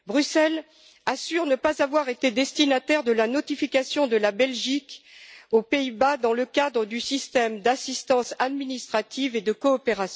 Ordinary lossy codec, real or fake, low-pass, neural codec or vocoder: none; real; none; none